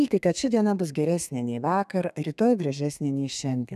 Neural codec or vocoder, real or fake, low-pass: codec, 32 kHz, 1.9 kbps, SNAC; fake; 14.4 kHz